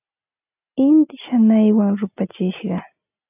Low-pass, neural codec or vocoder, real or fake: 3.6 kHz; none; real